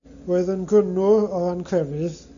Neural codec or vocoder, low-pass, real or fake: none; 7.2 kHz; real